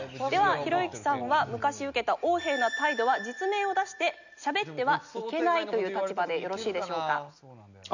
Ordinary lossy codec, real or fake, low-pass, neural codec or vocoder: none; real; 7.2 kHz; none